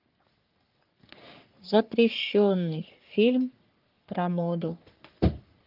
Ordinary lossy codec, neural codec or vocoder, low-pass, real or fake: Opus, 24 kbps; codec, 44.1 kHz, 3.4 kbps, Pupu-Codec; 5.4 kHz; fake